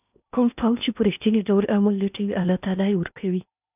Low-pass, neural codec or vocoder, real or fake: 3.6 kHz; codec, 16 kHz in and 24 kHz out, 0.8 kbps, FocalCodec, streaming, 65536 codes; fake